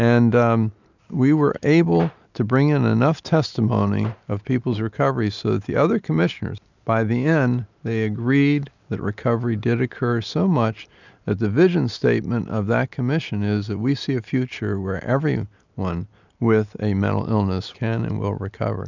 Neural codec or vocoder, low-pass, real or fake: none; 7.2 kHz; real